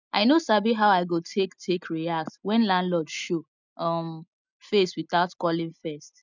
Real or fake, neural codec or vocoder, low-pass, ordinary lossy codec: real; none; 7.2 kHz; none